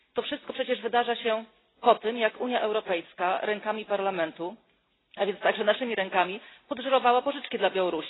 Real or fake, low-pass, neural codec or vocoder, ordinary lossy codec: real; 7.2 kHz; none; AAC, 16 kbps